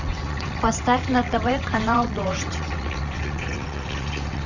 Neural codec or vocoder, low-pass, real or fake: vocoder, 22.05 kHz, 80 mel bands, WaveNeXt; 7.2 kHz; fake